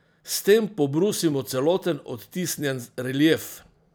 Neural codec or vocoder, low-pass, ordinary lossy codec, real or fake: vocoder, 44.1 kHz, 128 mel bands every 256 samples, BigVGAN v2; none; none; fake